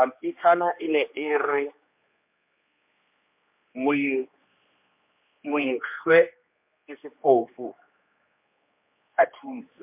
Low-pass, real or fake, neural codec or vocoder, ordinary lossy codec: 3.6 kHz; fake; codec, 16 kHz, 2 kbps, X-Codec, HuBERT features, trained on general audio; MP3, 32 kbps